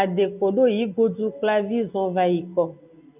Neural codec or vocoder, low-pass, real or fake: none; 3.6 kHz; real